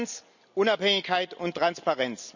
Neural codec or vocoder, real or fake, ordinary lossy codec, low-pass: none; real; none; 7.2 kHz